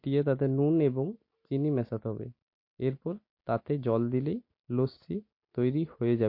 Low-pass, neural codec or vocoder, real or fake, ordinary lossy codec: 5.4 kHz; none; real; MP3, 32 kbps